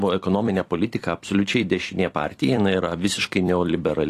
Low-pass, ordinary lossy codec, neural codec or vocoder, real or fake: 14.4 kHz; AAC, 48 kbps; vocoder, 44.1 kHz, 128 mel bands every 256 samples, BigVGAN v2; fake